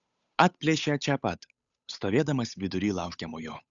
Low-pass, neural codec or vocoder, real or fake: 7.2 kHz; codec, 16 kHz, 8 kbps, FunCodec, trained on Chinese and English, 25 frames a second; fake